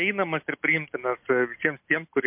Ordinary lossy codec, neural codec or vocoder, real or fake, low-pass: MP3, 32 kbps; none; real; 3.6 kHz